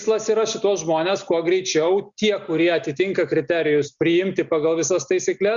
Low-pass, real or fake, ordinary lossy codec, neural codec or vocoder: 7.2 kHz; real; Opus, 64 kbps; none